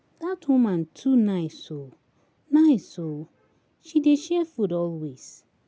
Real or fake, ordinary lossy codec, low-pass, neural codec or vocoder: real; none; none; none